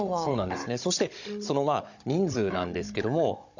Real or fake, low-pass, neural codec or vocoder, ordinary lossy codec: fake; 7.2 kHz; codec, 16 kHz, 16 kbps, FunCodec, trained on Chinese and English, 50 frames a second; none